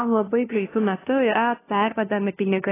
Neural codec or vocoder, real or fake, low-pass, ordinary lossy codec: codec, 16 kHz, 0.5 kbps, FunCodec, trained on LibriTTS, 25 frames a second; fake; 3.6 kHz; AAC, 16 kbps